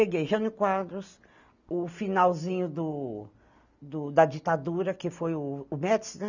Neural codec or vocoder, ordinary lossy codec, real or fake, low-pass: none; none; real; 7.2 kHz